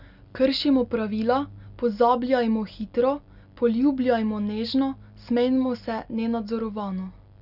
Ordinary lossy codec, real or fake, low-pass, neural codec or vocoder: none; real; 5.4 kHz; none